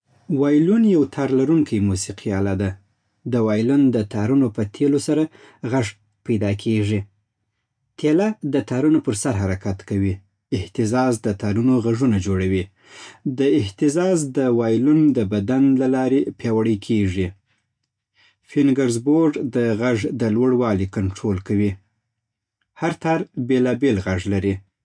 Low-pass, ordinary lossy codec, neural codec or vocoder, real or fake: 9.9 kHz; none; none; real